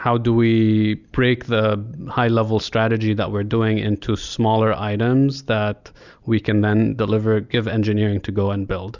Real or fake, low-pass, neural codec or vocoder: real; 7.2 kHz; none